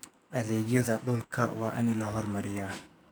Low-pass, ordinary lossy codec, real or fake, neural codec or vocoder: none; none; fake; codec, 44.1 kHz, 2.6 kbps, SNAC